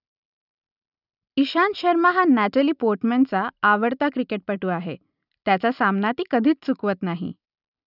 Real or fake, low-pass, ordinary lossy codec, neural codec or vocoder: real; 5.4 kHz; none; none